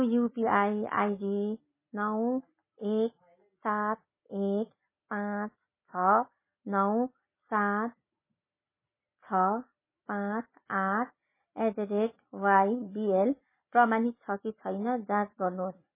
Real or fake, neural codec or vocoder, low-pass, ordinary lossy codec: real; none; 3.6 kHz; MP3, 16 kbps